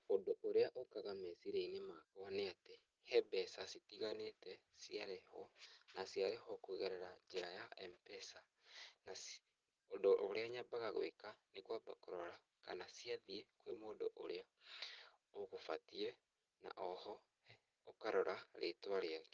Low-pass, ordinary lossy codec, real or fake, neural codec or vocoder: 7.2 kHz; Opus, 16 kbps; real; none